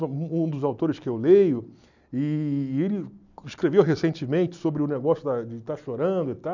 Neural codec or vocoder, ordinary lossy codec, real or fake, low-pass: none; none; real; 7.2 kHz